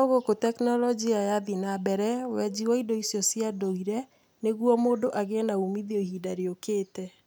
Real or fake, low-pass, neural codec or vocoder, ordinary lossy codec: real; none; none; none